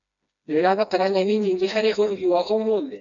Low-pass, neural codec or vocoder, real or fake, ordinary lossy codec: 7.2 kHz; codec, 16 kHz, 1 kbps, FreqCodec, smaller model; fake; none